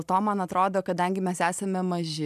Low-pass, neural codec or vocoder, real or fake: 14.4 kHz; none; real